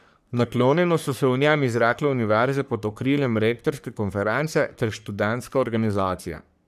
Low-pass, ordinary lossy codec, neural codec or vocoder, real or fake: 14.4 kHz; none; codec, 44.1 kHz, 3.4 kbps, Pupu-Codec; fake